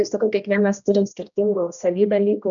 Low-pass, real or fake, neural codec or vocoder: 7.2 kHz; fake; codec, 16 kHz, 1 kbps, X-Codec, HuBERT features, trained on general audio